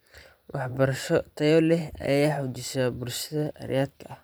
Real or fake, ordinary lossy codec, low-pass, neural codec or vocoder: fake; none; none; vocoder, 44.1 kHz, 128 mel bands every 512 samples, BigVGAN v2